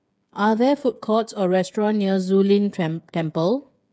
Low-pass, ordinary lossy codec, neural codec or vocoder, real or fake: none; none; codec, 16 kHz, 8 kbps, FreqCodec, smaller model; fake